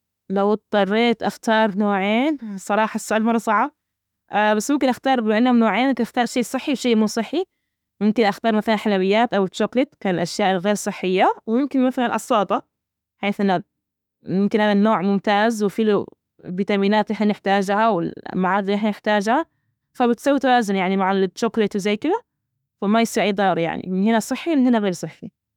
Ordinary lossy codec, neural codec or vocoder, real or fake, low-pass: none; autoencoder, 48 kHz, 32 numbers a frame, DAC-VAE, trained on Japanese speech; fake; 19.8 kHz